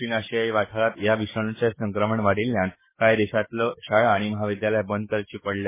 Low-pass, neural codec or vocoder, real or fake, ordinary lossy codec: 3.6 kHz; codec, 44.1 kHz, 7.8 kbps, DAC; fake; MP3, 16 kbps